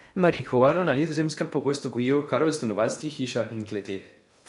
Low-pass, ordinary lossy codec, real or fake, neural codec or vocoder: 10.8 kHz; none; fake; codec, 16 kHz in and 24 kHz out, 0.6 kbps, FocalCodec, streaming, 2048 codes